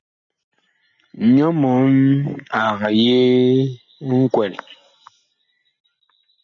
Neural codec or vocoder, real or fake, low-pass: none; real; 7.2 kHz